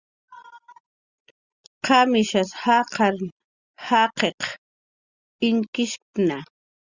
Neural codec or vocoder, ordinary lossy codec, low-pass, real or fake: none; Opus, 64 kbps; 7.2 kHz; real